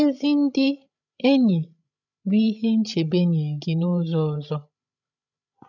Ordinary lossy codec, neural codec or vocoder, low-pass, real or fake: none; codec, 16 kHz, 16 kbps, FreqCodec, larger model; 7.2 kHz; fake